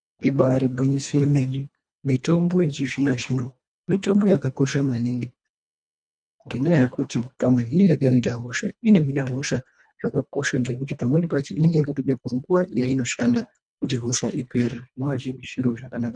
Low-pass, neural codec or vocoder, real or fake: 9.9 kHz; codec, 24 kHz, 1.5 kbps, HILCodec; fake